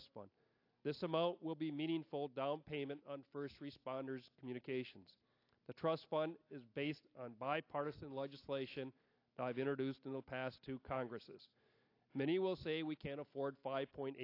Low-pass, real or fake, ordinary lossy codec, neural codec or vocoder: 5.4 kHz; real; AAC, 32 kbps; none